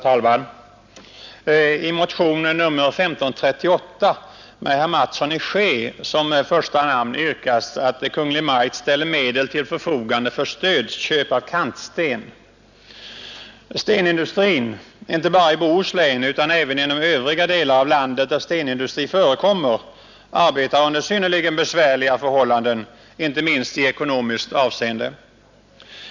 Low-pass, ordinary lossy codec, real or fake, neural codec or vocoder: 7.2 kHz; none; real; none